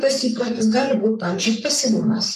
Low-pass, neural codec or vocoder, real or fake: 14.4 kHz; codec, 44.1 kHz, 3.4 kbps, Pupu-Codec; fake